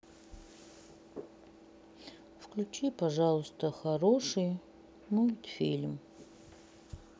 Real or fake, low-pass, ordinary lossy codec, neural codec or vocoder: real; none; none; none